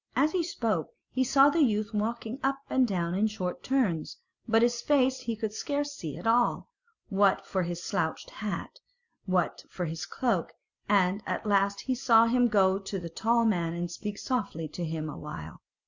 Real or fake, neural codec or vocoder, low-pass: real; none; 7.2 kHz